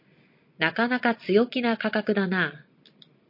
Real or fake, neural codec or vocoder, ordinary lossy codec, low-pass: real; none; MP3, 32 kbps; 5.4 kHz